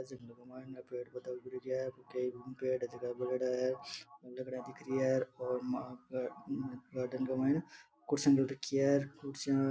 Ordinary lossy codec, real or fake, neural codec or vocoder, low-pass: none; real; none; none